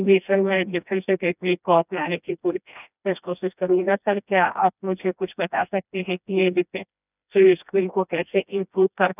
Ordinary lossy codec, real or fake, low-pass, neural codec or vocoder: none; fake; 3.6 kHz; codec, 16 kHz, 1 kbps, FreqCodec, smaller model